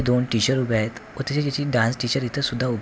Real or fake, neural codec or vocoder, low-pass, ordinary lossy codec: real; none; none; none